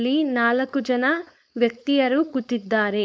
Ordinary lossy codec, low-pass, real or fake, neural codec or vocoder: none; none; fake; codec, 16 kHz, 4.8 kbps, FACodec